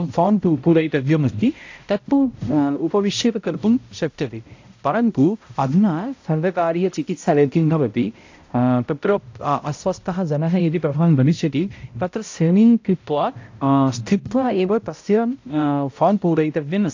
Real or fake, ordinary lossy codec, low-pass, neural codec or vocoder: fake; AAC, 48 kbps; 7.2 kHz; codec, 16 kHz, 0.5 kbps, X-Codec, HuBERT features, trained on balanced general audio